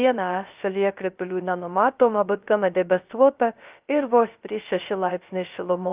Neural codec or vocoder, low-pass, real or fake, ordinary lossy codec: codec, 16 kHz, 0.3 kbps, FocalCodec; 3.6 kHz; fake; Opus, 24 kbps